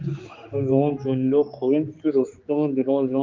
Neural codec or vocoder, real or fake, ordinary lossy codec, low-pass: codec, 16 kHz, 4 kbps, X-Codec, HuBERT features, trained on general audio; fake; Opus, 32 kbps; 7.2 kHz